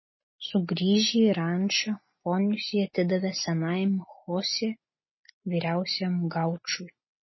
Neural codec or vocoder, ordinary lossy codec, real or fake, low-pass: none; MP3, 24 kbps; real; 7.2 kHz